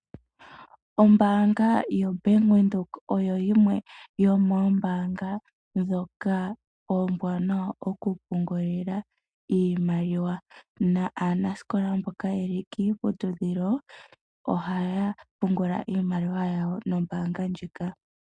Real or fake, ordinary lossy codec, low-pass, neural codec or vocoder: real; MP3, 64 kbps; 9.9 kHz; none